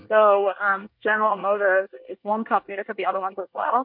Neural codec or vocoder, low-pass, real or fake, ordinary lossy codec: codec, 24 kHz, 1 kbps, SNAC; 5.4 kHz; fake; MP3, 48 kbps